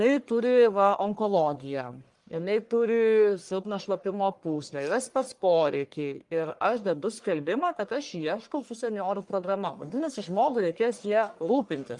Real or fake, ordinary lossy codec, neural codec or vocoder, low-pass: fake; Opus, 32 kbps; codec, 44.1 kHz, 1.7 kbps, Pupu-Codec; 10.8 kHz